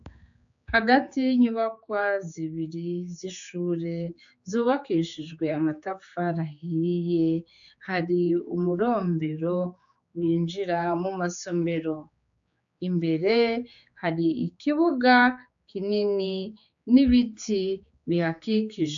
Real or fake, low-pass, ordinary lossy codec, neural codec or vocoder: fake; 7.2 kHz; AAC, 64 kbps; codec, 16 kHz, 4 kbps, X-Codec, HuBERT features, trained on general audio